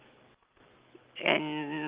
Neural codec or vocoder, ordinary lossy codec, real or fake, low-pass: none; Opus, 32 kbps; real; 3.6 kHz